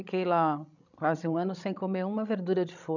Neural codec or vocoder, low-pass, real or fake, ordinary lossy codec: codec, 16 kHz, 16 kbps, FreqCodec, larger model; 7.2 kHz; fake; none